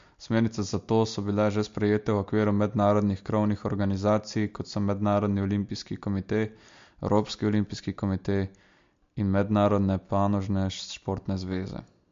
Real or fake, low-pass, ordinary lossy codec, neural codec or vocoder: real; 7.2 kHz; MP3, 48 kbps; none